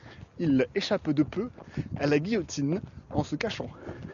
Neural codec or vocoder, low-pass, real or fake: none; 7.2 kHz; real